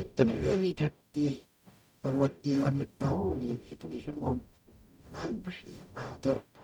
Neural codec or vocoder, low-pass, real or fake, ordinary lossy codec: codec, 44.1 kHz, 0.9 kbps, DAC; 19.8 kHz; fake; none